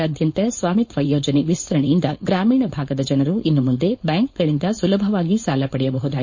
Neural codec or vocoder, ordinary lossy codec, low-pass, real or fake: codec, 16 kHz, 4.8 kbps, FACodec; MP3, 32 kbps; 7.2 kHz; fake